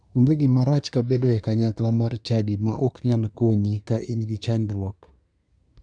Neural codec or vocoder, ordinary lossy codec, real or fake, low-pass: codec, 24 kHz, 1 kbps, SNAC; none; fake; 9.9 kHz